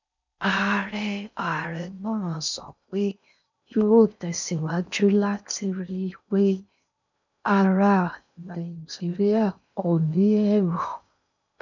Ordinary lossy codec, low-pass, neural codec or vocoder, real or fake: none; 7.2 kHz; codec, 16 kHz in and 24 kHz out, 0.6 kbps, FocalCodec, streaming, 4096 codes; fake